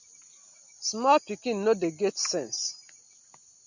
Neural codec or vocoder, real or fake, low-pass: none; real; 7.2 kHz